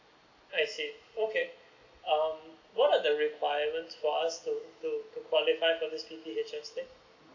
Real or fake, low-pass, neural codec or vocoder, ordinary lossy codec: real; 7.2 kHz; none; AAC, 48 kbps